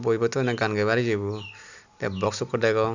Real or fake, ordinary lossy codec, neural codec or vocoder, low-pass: real; none; none; 7.2 kHz